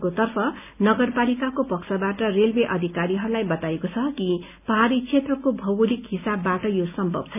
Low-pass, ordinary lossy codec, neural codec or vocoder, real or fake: 3.6 kHz; none; none; real